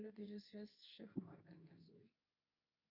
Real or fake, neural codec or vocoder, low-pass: fake; codec, 24 kHz, 0.9 kbps, WavTokenizer, medium speech release version 2; 5.4 kHz